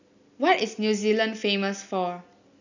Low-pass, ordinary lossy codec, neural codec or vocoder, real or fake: 7.2 kHz; none; none; real